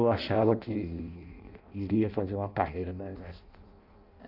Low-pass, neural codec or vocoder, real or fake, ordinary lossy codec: 5.4 kHz; codec, 16 kHz in and 24 kHz out, 0.6 kbps, FireRedTTS-2 codec; fake; none